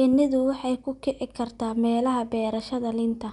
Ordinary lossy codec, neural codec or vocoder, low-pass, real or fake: none; none; 10.8 kHz; real